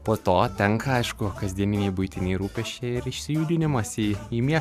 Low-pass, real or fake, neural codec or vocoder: 14.4 kHz; fake; vocoder, 44.1 kHz, 128 mel bands every 512 samples, BigVGAN v2